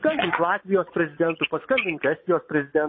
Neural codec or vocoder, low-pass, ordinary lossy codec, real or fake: vocoder, 22.05 kHz, 80 mel bands, Vocos; 7.2 kHz; MP3, 24 kbps; fake